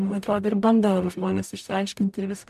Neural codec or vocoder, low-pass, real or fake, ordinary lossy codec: codec, 44.1 kHz, 0.9 kbps, DAC; 14.4 kHz; fake; MP3, 96 kbps